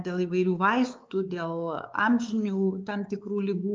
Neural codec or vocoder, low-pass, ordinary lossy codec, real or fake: codec, 16 kHz, 4 kbps, X-Codec, WavLM features, trained on Multilingual LibriSpeech; 7.2 kHz; Opus, 24 kbps; fake